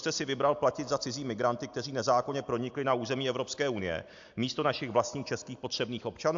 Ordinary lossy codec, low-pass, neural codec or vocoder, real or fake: AAC, 64 kbps; 7.2 kHz; none; real